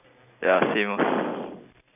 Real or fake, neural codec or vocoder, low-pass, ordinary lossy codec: real; none; 3.6 kHz; AAC, 32 kbps